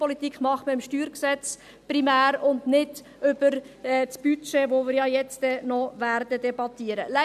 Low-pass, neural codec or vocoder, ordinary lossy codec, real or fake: 14.4 kHz; none; none; real